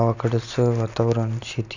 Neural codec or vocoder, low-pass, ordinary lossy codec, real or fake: none; 7.2 kHz; none; real